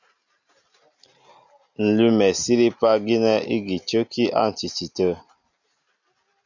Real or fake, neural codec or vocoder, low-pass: real; none; 7.2 kHz